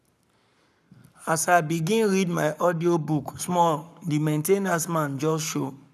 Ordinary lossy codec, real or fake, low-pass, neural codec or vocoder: none; fake; 14.4 kHz; codec, 44.1 kHz, 7.8 kbps, Pupu-Codec